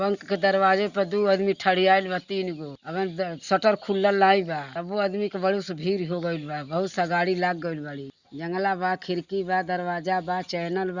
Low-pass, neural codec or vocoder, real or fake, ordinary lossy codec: 7.2 kHz; none; real; Opus, 64 kbps